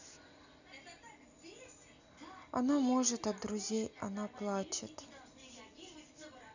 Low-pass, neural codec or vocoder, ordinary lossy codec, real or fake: 7.2 kHz; none; none; real